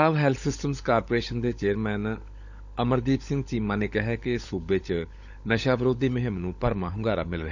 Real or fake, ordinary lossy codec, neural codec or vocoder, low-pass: fake; none; codec, 16 kHz, 16 kbps, FunCodec, trained on LibriTTS, 50 frames a second; 7.2 kHz